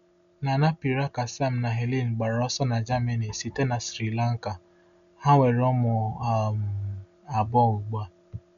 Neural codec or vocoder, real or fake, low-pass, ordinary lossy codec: none; real; 7.2 kHz; none